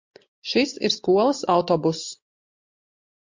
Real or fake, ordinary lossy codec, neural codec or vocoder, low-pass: real; MP3, 48 kbps; none; 7.2 kHz